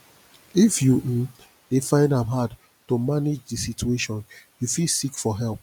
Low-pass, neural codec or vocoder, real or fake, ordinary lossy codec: 19.8 kHz; none; real; none